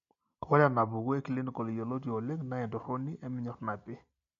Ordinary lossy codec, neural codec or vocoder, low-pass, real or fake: MP3, 48 kbps; none; 7.2 kHz; real